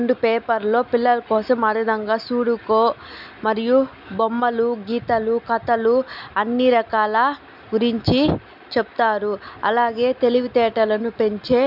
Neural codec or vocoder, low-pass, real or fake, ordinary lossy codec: none; 5.4 kHz; real; none